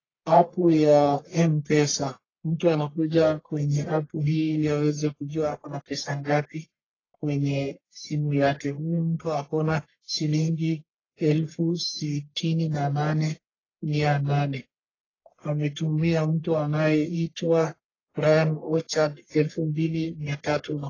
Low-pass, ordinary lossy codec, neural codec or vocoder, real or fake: 7.2 kHz; AAC, 32 kbps; codec, 44.1 kHz, 1.7 kbps, Pupu-Codec; fake